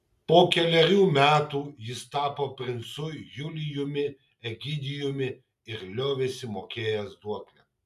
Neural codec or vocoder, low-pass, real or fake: none; 14.4 kHz; real